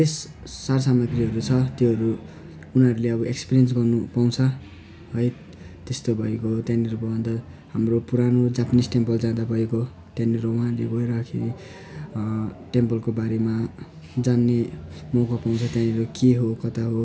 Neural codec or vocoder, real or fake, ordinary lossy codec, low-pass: none; real; none; none